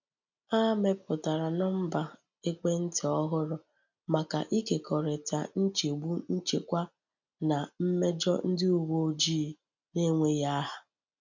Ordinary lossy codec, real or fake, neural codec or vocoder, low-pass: none; real; none; 7.2 kHz